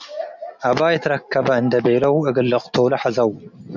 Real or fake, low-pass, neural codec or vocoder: real; 7.2 kHz; none